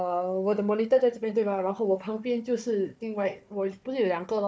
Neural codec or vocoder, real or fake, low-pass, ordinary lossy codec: codec, 16 kHz, 4 kbps, FunCodec, trained on Chinese and English, 50 frames a second; fake; none; none